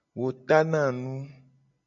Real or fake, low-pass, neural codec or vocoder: real; 7.2 kHz; none